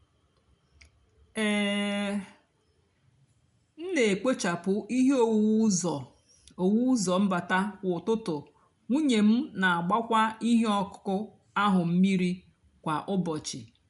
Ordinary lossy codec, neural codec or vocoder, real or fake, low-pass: none; none; real; 10.8 kHz